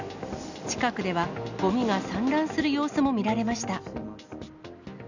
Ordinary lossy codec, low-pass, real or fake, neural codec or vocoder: none; 7.2 kHz; real; none